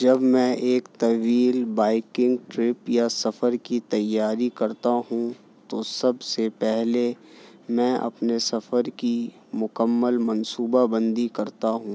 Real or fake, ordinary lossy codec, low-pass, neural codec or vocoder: real; none; none; none